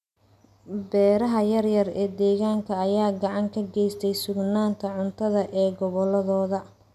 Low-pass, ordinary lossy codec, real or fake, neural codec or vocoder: 14.4 kHz; none; real; none